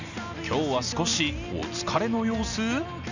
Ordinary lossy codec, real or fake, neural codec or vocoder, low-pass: none; real; none; 7.2 kHz